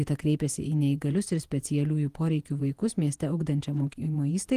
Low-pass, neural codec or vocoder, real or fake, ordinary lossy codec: 14.4 kHz; vocoder, 48 kHz, 128 mel bands, Vocos; fake; Opus, 24 kbps